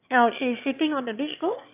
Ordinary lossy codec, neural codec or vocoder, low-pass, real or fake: AAC, 32 kbps; autoencoder, 22.05 kHz, a latent of 192 numbers a frame, VITS, trained on one speaker; 3.6 kHz; fake